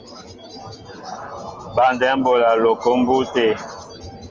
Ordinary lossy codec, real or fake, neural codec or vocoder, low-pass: Opus, 32 kbps; real; none; 7.2 kHz